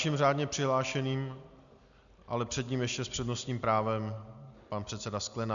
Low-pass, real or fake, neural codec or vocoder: 7.2 kHz; real; none